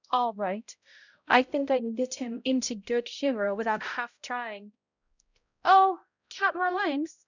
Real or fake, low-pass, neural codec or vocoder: fake; 7.2 kHz; codec, 16 kHz, 0.5 kbps, X-Codec, HuBERT features, trained on balanced general audio